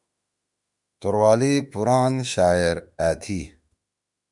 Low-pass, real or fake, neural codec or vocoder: 10.8 kHz; fake; autoencoder, 48 kHz, 32 numbers a frame, DAC-VAE, trained on Japanese speech